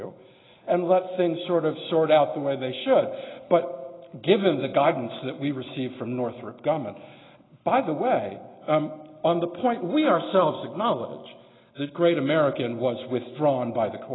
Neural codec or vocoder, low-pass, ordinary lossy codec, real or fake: none; 7.2 kHz; AAC, 16 kbps; real